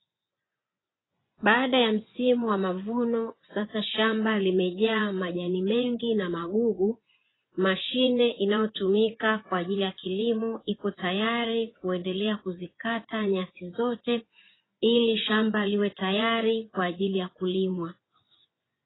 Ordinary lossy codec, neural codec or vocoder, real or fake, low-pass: AAC, 16 kbps; vocoder, 24 kHz, 100 mel bands, Vocos; fake; 7.2 kHz